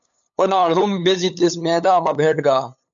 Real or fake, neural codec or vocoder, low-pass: fake; codec, 16 kHz, 8 kbps, FunCodec, trained on LibriTTS, 25 frames a second; 7.2 kHz